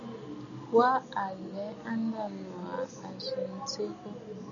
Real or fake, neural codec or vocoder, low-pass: real; none; 7.2 kHz